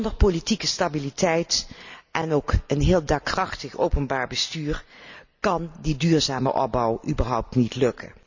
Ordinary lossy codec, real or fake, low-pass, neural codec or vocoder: none; real; 7.2 kHz; none